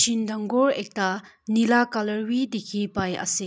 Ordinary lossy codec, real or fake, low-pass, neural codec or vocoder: none; real; none; none